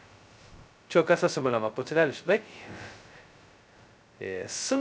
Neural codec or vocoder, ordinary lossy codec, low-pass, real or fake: codec, 16 kHz, 0.2 kbps, FocalCodec; none; none; fake